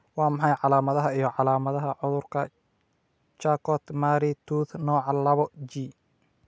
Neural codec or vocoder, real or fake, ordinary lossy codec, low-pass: none; real; none; none